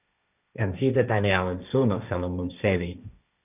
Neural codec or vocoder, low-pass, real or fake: codec, 16 kHz, 1.1 kbps, Voila-Tokenizer; 3.6 kHz; fake